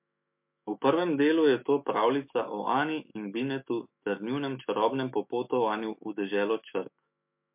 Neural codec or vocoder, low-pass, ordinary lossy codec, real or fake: autoencoder, 48 kHz, 128 numbers a frame, DAC-VAE, trained on Japanese speech; 3.6 kHz; MP3, 32 kbps; fake